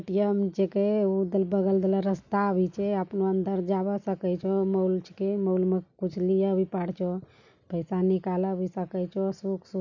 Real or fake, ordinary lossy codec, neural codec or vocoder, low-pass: real; none; none; 7.2 kHz